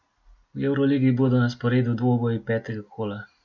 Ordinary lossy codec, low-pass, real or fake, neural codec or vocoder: none; 7.2 kHz; real; none